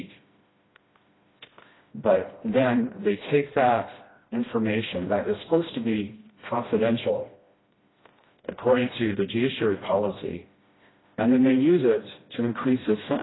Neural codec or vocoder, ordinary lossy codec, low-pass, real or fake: codec, 16 kHz, 1 kbps, FreqCodec, smaller model; AAC, 16 kbps; 7.2 kHz; fake